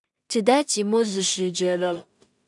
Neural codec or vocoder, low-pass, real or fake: codec, 16 kHz in and 24 kHz out, 0.4 kbps, LongCat-Audio-Codec, two codebook decoder; 10.8 kHz; fake